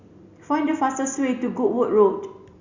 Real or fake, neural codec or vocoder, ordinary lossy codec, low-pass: real; none; Opus, 64 kbps; 7.2 kHz